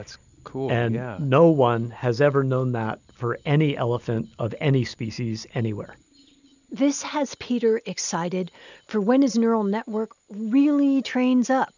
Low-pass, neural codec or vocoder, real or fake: 7.2 kHz; none; real